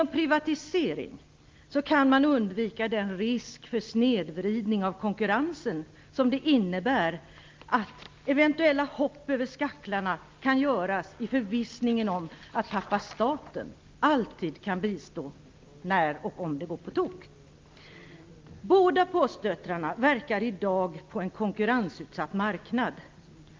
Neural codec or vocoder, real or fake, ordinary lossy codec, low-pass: none; real; Opus, 24 kbps; 7.2 kHz